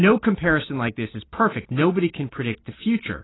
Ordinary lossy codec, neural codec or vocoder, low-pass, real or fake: AAC, 16 kbps; none; 7.2 kHz; real